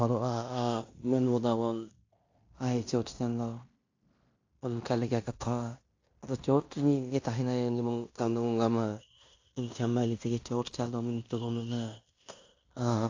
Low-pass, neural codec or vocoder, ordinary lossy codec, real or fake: 7.2 kHz; codec, 16 kHz in and 24 kHz out, 0.9 kbps, LongCat-Audio-Codec, fine tuned four codebook decoder; AAC, 48 kbps; fake